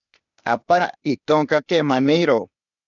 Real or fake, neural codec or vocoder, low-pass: fake; codec, 16 kHz, 0.8 kbps, ZipCodec; 7.2 kHz